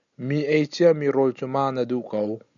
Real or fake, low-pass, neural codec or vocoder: real; 7.2 kHz; none